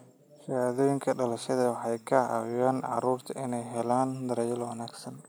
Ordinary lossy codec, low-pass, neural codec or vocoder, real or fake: none; none; none; real